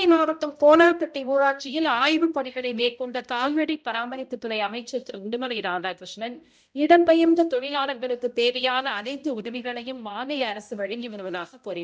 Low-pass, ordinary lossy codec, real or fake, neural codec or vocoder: none; none; fake; codec, 16 kHz, 0.5 kbps, X-Codec, HuBERT features, trained on balanced general audio